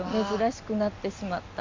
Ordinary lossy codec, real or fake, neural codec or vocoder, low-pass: MP3, 48 kbps; real; none; 7.2 kHz